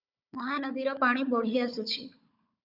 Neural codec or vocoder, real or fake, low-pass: codec, 16 kHz, 16 kbps, FunCodec, trained on Chinese and English, 50 frames a second; fake; 5.4 kHz